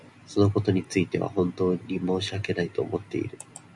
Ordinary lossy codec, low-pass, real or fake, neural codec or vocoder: MP3, 96 kbps; 10.8 kHz; real; none